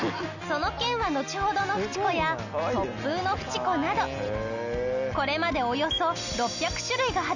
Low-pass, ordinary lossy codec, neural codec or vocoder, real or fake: 7.2 kHz; none; none; real